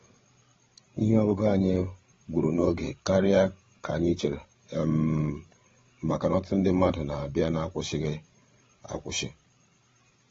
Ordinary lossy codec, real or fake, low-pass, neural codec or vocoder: AAC, 24 kbps; fake; 7.2 kHz; codec, 16 kHz, 8 kbps, FreqCodec, larger model